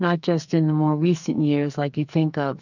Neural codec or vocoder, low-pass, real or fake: codec, 44.1 kHz, 2.6 kbps, SNAC; 7.2 kHz; fake